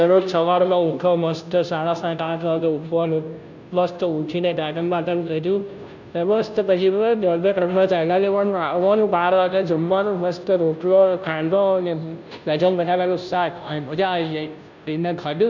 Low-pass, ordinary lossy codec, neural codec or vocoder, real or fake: 7.2 kHz; none; codec, 16 kHz, 0.5 kbps, FunCodec, trained on Chinese and English, 25 frames a second; fake